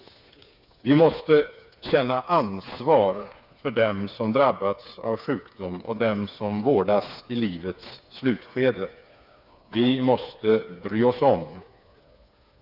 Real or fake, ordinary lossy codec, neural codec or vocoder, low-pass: fake; none; codec, 16 kHz, 4 kbps, FreqCodec, smaller model; 5.4 kHz